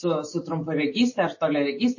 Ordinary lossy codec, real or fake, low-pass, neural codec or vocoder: MP3, 32 kbps; real; 7.2 kHz; none